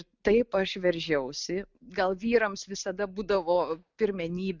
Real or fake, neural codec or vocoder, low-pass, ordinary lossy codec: real; none; 7.2 kHz; Opus, 64 kbps